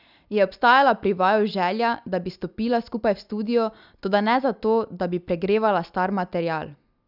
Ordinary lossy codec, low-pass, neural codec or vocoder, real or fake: none; 5.4 kHz; none; real